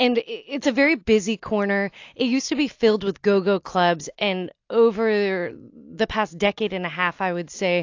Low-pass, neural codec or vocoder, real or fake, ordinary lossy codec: 7.2 kHz; none; real; AAC, 48 kbps